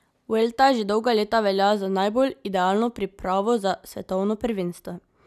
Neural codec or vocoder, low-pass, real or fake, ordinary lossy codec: none; 14.4 kHz; real; none